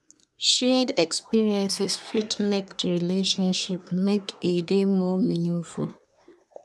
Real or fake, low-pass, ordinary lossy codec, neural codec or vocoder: fake; none; none; codec, 24 kHz, 1 kbps, SNAC